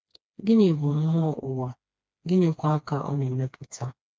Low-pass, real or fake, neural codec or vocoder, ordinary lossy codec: none; fake; codec, 16 kHz, 2 kbps, FreqCodec, smaller model; none